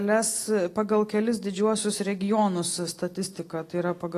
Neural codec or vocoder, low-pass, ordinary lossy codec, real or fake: none; 14.4 kHz; AAC, 48 kbps; real